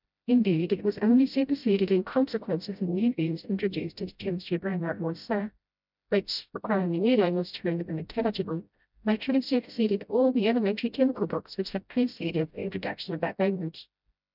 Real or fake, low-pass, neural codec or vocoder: fake; 5.4 kHz; codec, 16 kHz, 0.5 kbps, FreqCodec, smaller model